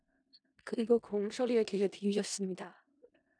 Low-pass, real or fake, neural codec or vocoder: 9.9 kHz; fake; codec, 16 kHz in and 24 kHz out, 0.4 kbps, LongCat-Audio-Codec, four codebook decoder